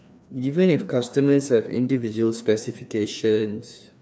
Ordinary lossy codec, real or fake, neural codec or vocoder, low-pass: none; fake; codec, 16 kHz, 2 kbps, FreqCodec, larger model; none